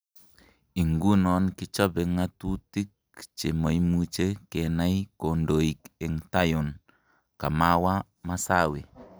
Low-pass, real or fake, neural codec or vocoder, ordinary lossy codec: none; real; none; none